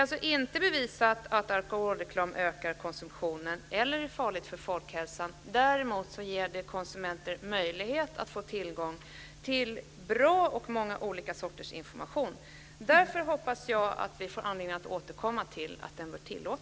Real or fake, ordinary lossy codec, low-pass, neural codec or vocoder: real; none; none; none